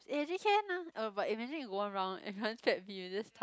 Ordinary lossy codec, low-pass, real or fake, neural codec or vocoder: none; none; real; none